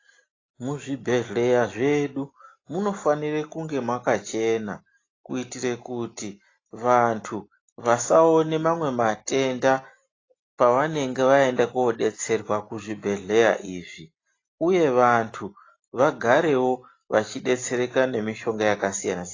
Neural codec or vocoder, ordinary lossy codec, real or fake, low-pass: none; AAC, 32 kbps; real; 7.2 kHz